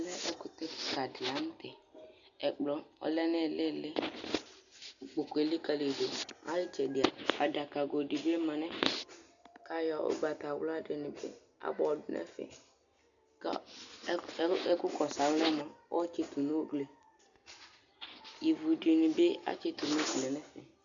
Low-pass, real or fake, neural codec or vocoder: 7.2 kHz; real; none